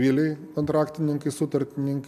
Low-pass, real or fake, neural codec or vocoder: 14.4 kHz; real; none